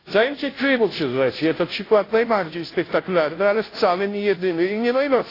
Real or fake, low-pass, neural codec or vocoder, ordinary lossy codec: fake; 5.4 kHz; codec, 16 kHz, 0.5 kbps, FunCodec, trained on Chinese and English, 25 frames a second; AAC, 24 kbps